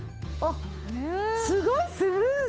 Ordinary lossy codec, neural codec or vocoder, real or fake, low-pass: none; codec, 16 kHz, 8 kbps, FunCodec, trained on Chinese and English, 25 frames a second; fake; none